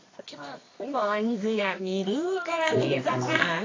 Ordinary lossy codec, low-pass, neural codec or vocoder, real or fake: AAC, 32 kbps; 7.2 kHz; codec, 24 kHz, 0.9 kbps, WavTokenizer, medium music audio release; fake